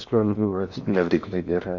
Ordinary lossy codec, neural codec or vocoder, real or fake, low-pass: none; codec, 16 kHz in and 24 kHz out, 0.6 kbps, FocalCodec, streaming, 4096 codes; fake; 7.2 kHz